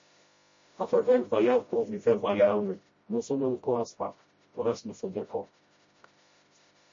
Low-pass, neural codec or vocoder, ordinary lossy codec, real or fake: 7.2 kHz; codec, 16 kHz, 0.5 kbps, FreqCodec, smaller model; MP3, 32 kbps; fake